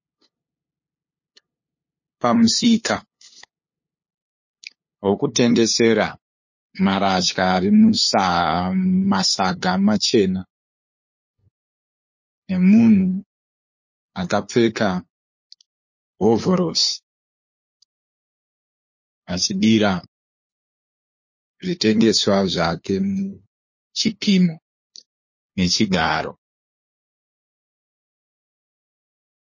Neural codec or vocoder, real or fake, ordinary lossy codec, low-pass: codec, 16 kHz, 2 kbps, FunCodec, trained on LibriTTS, 25 frames a second; fake; MP3, 32 kbps; 7.2 kHz